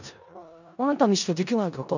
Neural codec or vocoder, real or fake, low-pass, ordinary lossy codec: codec, 16 kHz in and 24 kHz out, 0.4 kbps, LongCat-Audio-Codec, four codebook decoder; fake; 7.2 kHz; none